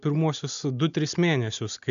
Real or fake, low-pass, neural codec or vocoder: real; 7.2 kHz; none